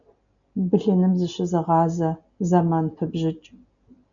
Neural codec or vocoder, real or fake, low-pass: none; real; 7.2 kHz